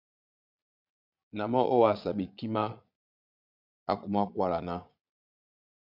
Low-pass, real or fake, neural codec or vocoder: 5.4 kHz; fake; vocoder, 22.05 kHz, 80 mel bands, Vocos